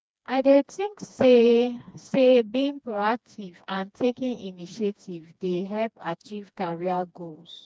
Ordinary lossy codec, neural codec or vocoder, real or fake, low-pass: none; codec, 16 kHz, 2 kbps, FreqCodec, smaller model; fake; none